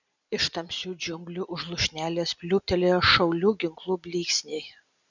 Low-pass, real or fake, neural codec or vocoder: 7.2 kHz; real; none